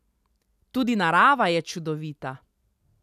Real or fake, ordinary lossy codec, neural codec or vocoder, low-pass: real; none; none; 14.4 kHz